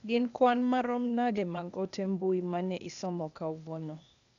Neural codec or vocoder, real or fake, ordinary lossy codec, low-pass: codec, 16 kHz, 0.8 kbps, ZipCodec; fake; none; 7.2 kHz